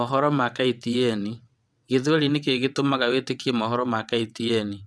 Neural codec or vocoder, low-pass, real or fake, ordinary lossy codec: vocoder, 22.05 kHz, 80 mel bands, WaveNeXt; none; fake; none